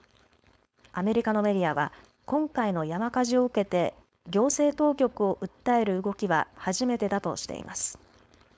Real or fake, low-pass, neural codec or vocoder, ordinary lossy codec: fake; none; codec, 16 kHz, 4.8 kbps, FACodec; none